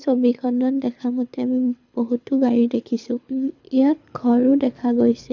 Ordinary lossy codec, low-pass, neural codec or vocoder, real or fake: none; 7.2 kHz; codec, 24 kHz, 6 kbps, HILCodec; fake